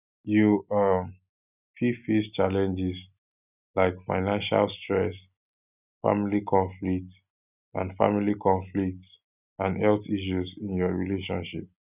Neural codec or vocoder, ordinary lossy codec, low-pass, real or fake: none; none; 3.6 kHz; real